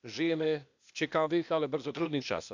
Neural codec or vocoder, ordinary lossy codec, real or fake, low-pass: codec, 16 kHz, 0.8 kbps, ZipCodec; MP3, 64 kbps; fake; 7.2 kHz